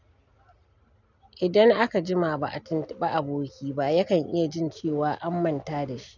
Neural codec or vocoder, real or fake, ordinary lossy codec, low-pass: none; real; none; 7.2 kHz